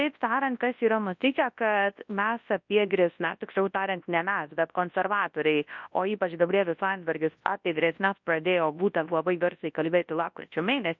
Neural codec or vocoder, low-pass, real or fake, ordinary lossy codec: codec, 24 kHz, 0.9 kbps, WavTokenizer, large speech release; 7.2 kHz; fake; MP3, 48 kbps